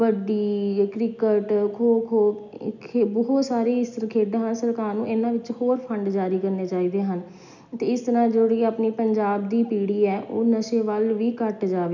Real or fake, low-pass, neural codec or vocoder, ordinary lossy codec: real; 7.2 kHz; none; none